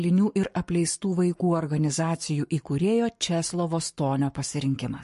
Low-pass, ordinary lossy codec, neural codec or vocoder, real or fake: 14.4 kHz; MP3, 48 kbps; codec, 44.1 kHz, 7.8 kbps, Pupu-Codec; fake